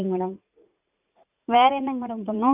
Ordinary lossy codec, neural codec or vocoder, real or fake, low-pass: none; vocoder, 44.1 kHz, 80 mel bands, Vocos; fake; 3.6 kHz